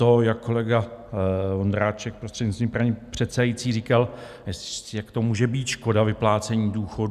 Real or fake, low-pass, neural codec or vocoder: real; 14.4 kHz; none